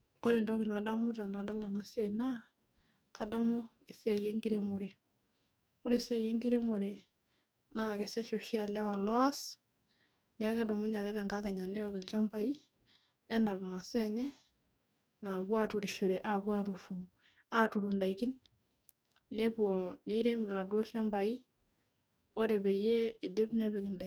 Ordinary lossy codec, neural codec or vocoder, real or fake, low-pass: none; codec, 44.1 kHz, 2.6 kbps, DAC; fake; none